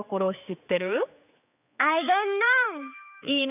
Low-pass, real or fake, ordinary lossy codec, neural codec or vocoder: 3.6 kHz; fake; none; codec, 16 kHz, 4 kbps, X-Codec, HuBERT features, trained on general audio